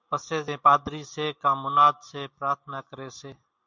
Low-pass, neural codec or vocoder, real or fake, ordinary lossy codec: 7.2 kHz; none; real; MP3, 64 kbps